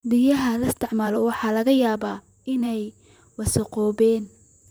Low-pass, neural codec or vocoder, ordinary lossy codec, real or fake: none; vocoder, 44.1 kHz, 128 mel bands, Pupu-Vocoder; none; fake